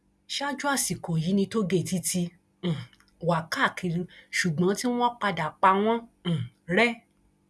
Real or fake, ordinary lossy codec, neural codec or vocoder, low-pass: real; none; none; none